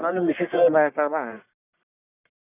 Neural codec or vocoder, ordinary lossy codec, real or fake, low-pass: codec, 44.1 kHz, 1.7 kbps, Pupu-Codec; none; fake; 3.6 kHz